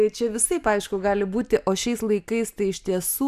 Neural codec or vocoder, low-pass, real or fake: none; 14.4 kHz; real